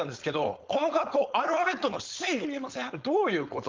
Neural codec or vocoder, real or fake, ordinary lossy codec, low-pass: codec, 16 kHz, 4.8 kbps, FACodec; fake; Opus, 24 kbps; 7.2 kHz